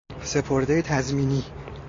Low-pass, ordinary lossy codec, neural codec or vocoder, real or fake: 7.2 kHz; AAC, 32 kbps; none; real